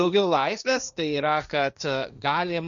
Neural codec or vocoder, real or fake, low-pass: codec, 16 kHz, 1.1 kbps, Voila-Tokenizer; fake; 7.2 kHz